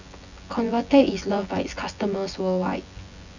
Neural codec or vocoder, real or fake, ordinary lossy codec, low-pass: vocoder, 24 kHz, 100 mel bands, Vocos; fake; none; 7.2 kHz